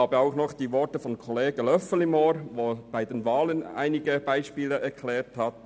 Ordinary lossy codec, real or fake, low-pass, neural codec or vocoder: none; real; none; none